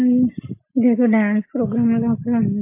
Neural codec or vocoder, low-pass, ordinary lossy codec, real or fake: none; 3.6 kHz; MP3, 24 kbps; real